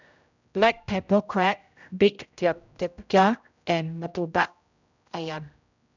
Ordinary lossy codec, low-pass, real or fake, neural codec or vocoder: none; 7.2 kHz; fake; codec, 16 kHz, 0.5 kbps, X-Codec, HuBERT features, trained on general audio